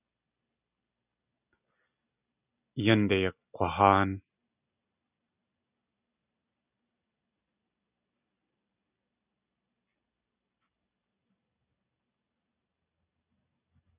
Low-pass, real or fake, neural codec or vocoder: 3.6 kHz; real; none